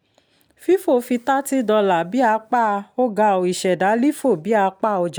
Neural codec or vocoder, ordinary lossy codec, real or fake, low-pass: none; none; real; none